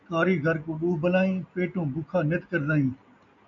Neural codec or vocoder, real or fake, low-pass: none; real; 7.2 kHz